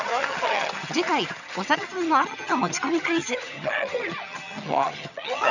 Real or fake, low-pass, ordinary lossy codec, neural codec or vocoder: fake; 7.2 kHz; none; vocoder, 22.05 kHz, 80 mel bands, HiFi-GAN